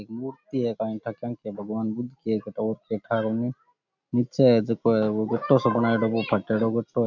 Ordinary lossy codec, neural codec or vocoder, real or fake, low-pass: none; none; real; 7.2 kHz